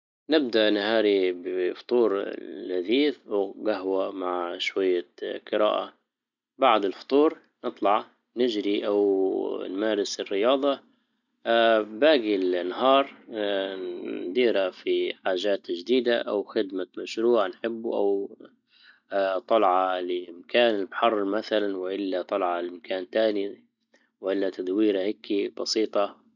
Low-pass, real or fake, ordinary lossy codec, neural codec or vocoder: 7.2 kHz; real; none; none